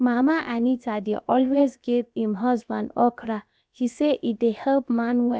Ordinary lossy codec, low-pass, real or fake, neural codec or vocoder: none; none; fake; codec, 16 kHz, about 1 kbps, DyCAST, with the encoder's durations